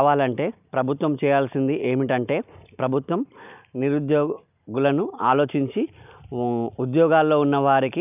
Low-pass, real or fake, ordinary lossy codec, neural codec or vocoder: 3.6 kHz; real; none; none